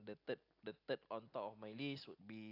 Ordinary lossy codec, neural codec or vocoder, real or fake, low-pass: AAC, 32 kbps; none; real; 5.4 kHz